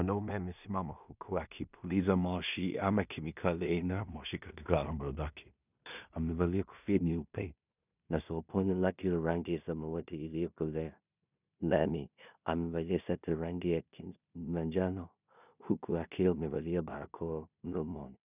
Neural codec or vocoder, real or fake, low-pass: codec, 16 kHz in and 24 kHz out, 0.4 kbps, LongCat-Audio-Codec, two codebook decoder; fake; 3.6 kHz